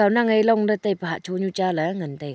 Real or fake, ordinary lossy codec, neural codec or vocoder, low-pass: real; none; none; none